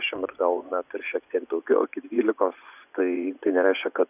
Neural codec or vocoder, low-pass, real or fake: vocoder, 24 kHz, 100 mel bands, Vocos; 3.6 kHz; fake